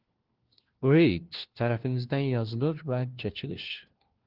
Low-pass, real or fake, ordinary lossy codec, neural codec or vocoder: 5.4 kHz; fake; Opus, 16 kbps; codec, 16 kHz, 1 kbps, FunCodec, trained on LibriTTS, 50 frames a second